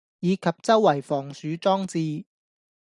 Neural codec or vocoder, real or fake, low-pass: none; real; 10.8 kHz